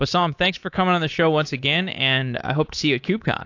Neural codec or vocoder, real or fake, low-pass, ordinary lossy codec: codec, 24 kHz, 3.1 kbps, DualCodec; fake; 7.2 kHz; AAC, 48 kbps